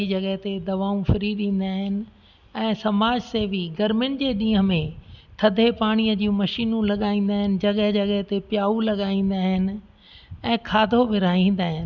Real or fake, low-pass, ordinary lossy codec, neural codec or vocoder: real; 7.2 kHz; none; none